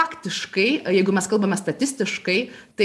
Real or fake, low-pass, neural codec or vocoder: real; 14.4 kHz; none